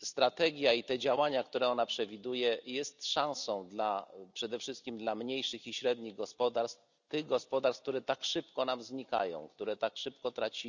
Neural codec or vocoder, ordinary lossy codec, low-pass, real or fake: none; none; 7.2 kHz; real